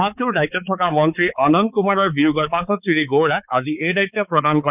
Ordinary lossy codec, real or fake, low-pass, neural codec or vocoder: none; fake; 3.6 kHz; codec, 16 kHz, 4 kbps, X-Codec, HuBERT features, trained on balanced general audio